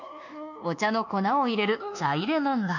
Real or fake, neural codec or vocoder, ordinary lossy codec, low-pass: fake; codec, 24 kHz, 1.2 kbps, DualCodec; none; 7.2 kHz